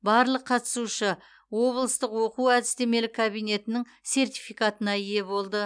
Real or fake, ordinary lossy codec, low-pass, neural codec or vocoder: real; none; 9.9 kHz; none